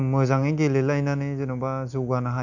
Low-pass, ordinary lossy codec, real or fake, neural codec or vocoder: 7.2 kHz; none; real; none